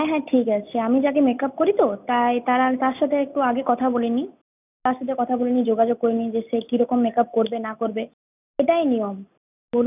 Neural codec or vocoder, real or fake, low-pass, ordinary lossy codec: none; real; 3.6 kHz; none